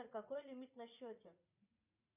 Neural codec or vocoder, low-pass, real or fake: vocoder, 44.1 kHz, 80 mel bands, Vocos; 3.6 kHz; fake